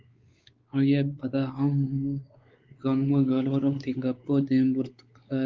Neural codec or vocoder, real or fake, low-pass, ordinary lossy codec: codec, 16 kHz, 4 kbps, X-Codec, WavLM features, trained on Multilingual LibriSpeech; fake; 7.2 kHz; Opus, 32 kbps